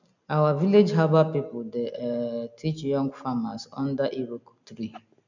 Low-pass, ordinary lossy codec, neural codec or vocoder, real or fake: 7.2 kHz; none; none; real